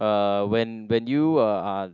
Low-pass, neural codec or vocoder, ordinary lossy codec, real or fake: 7.2 kHz; none; none; real